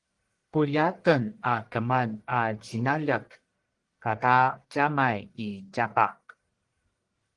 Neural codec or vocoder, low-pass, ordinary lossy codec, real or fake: codec, 44.1 kHz, 1.7 kbps, Pupu-Codec; 10.8 kHz; Opus, 24 kbps; fake